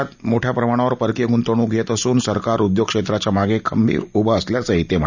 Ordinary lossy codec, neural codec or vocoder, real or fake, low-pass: none; none; real; 7.2 kHz